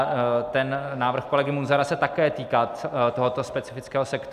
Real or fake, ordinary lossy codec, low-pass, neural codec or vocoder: real; AAC, 96 kbps; 14.4 kHz; none